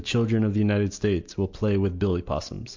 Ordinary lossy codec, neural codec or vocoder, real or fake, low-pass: MP3, 48 kbps; none; real; 7.2 kHz